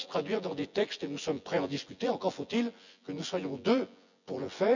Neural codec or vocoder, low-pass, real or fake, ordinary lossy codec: vocoder, 24 kHz, 100 mel bands, Vocos; 7.2 kHz; fake; none